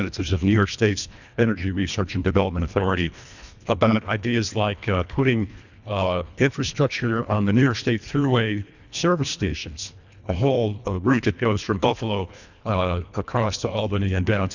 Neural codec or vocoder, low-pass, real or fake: codec, 24 kHz, 1.5 kbps, HILCodec; 7.2 kHz; fake